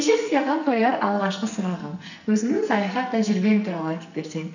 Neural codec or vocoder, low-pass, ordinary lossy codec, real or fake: codec, 44.1 kHz, 2.6 kbps, SNAC; 7.2 kHz; none; fake